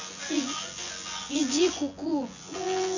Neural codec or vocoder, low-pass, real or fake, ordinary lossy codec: vocoder, 24 kHz, 100 mel bands, Vocos; 7.2 kHz; fake; none